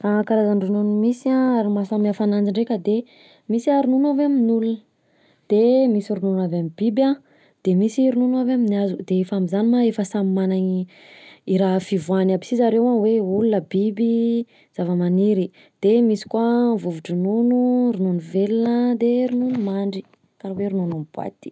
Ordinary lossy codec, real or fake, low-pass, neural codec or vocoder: none; real; none; none